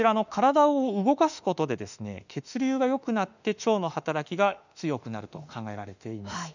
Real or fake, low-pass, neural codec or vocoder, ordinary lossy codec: fake; 7.2 kHz; autoencoder, 48 kHz, 32 numbers a frame, DAC-VAE, trained on Japanese speech; none